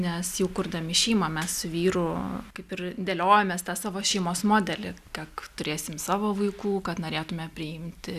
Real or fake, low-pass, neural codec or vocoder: real; 14.4 kHz; none